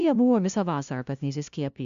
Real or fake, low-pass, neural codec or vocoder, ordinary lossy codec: fake; 7.2 kHz; codec, 16 kHz, 0.5 kbps, FunCodec, trained on LibriTTS, 25 frames a second; MP3, 64 kbps